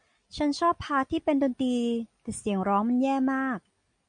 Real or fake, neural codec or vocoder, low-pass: real; none; 9.9 kHz